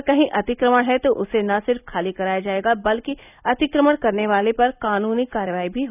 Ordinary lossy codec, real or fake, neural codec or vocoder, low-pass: none; real; none; 3.6 kHz